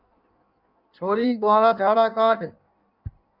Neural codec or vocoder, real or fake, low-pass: codec, 16 kHz in and 24 kHz out, 1.1 kbps, FireRedTTS-2 codec; fake; 5.4 kHz